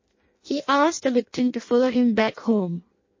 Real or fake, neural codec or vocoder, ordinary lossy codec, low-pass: fake; codec, 16 kHz in and 24 kHz out, 0.6 kbps, FireRedTTS-2 codec; MP3, 32 kbps; 7.2 kHz